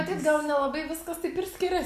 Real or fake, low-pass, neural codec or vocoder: real; 14.4 kHz; none